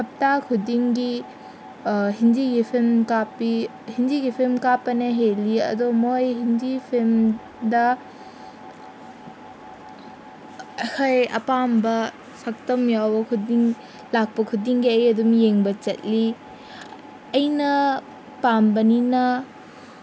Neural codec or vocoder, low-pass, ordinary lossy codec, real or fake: none; none; none; real